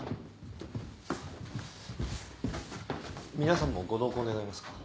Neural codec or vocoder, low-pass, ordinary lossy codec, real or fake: none; none; none; real